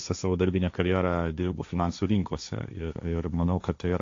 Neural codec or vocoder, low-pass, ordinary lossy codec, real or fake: codec, 16 kHz, 1.1 kbps, Voila-Tokenizer; 7.2 kHz; AAC, 48 kbps; fake